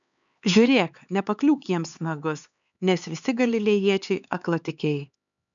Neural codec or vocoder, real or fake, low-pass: codec, 16 kHz, 4 kbps, X-Codec, HuBERT features, trained on LibriSpeech; fake; 7.2 kHz